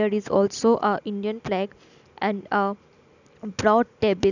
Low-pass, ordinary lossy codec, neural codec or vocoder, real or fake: 7.2 kHz; none; none; real